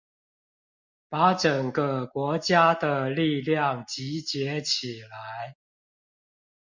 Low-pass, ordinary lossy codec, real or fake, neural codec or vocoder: 7.2 kHz; MP3, 64 kbps; real; none